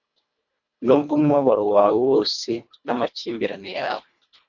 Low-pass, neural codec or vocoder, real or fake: 7.2 kHz; codec, 24 kHz, 1.5 kbps, HILCodec; fake